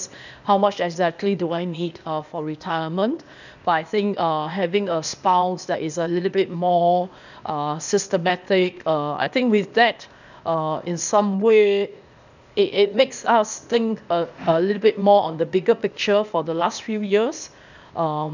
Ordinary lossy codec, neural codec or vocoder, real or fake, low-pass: none; codec, 16 kHz, 0.8 kbps, ZipCodec; fake; 7.2 kHz